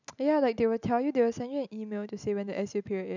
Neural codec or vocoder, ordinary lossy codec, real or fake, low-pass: none; none; real; 7.2 kHz